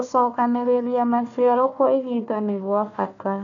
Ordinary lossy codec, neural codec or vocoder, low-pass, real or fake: none; codec, 16 kHz, 1 kbps, FunCodec, trained on Chinese and English, 50 frames a second; 7.2 kHz; fake